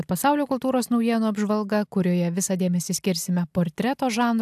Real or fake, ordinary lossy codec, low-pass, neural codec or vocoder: real; AAC, 96 kbps; 14.4 kHz; none